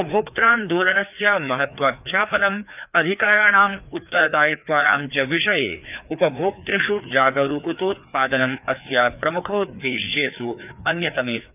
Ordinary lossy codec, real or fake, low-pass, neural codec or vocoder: none; fake; 3.6 kHz; codec, 16 kHz, 2 kbps, FreqCodec, larger model